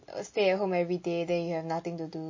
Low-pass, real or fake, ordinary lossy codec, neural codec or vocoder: 7.2 kHz; real; MP3, 32 kbps; none